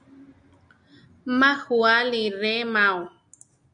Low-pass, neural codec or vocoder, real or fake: 9.9 kHz; none; real